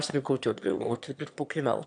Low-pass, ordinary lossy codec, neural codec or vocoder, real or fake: 9.9 kHz; AAC, 64 kbps; autoencoder, 22.05 kHz, a latent of 192 numbers a frame, VITS, trained on one speaker; fake